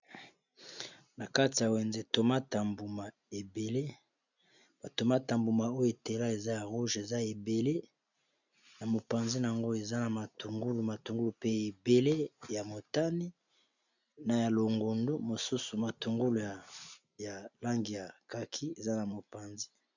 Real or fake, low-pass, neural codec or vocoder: real; 7.2 kHz; none